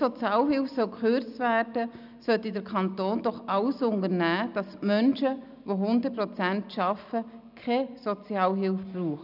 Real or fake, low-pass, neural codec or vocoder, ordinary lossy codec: real; 5.4 kHz; none; none